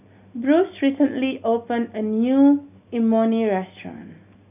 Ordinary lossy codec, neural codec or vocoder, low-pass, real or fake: none; none; 3.6 kHz; real